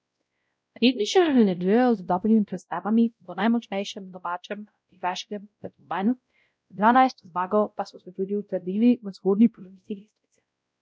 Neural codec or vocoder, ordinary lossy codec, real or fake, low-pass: codec, 16 kHz, 0.5 kbps, X-Codec, WavLM features, trained on Multilingual LibriSpeech; none; fake; none